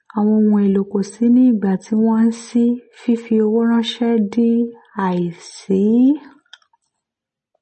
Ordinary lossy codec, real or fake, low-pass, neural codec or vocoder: MP3, 32 kbps; real; 10.8 kHz; none